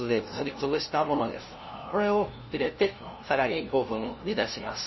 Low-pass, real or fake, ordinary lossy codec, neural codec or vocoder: 7.2 kHz; fake; MP3, 24 kbps; codec, 16 kHz, 0.5 kbps, FunCodec, trained on LibriTTS, 25 frames a second